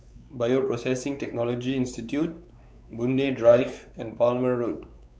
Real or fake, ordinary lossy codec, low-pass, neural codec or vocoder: fake; none; none; codec, 16 kHz, 4 kbps, X-Codec, WavLM features, trained on Multilingual LibriSpeech